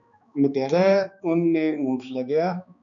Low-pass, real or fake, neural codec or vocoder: 7.2 kHz; fake; codec, 16 kHz, 2 kbps, X-Codec, HuBERT features, trained on balanced general audio